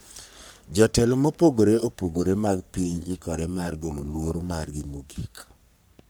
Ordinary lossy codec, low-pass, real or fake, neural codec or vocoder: none; none; fake; codec, 44.1 kHz, 3.4 kbps, Pupu-Codec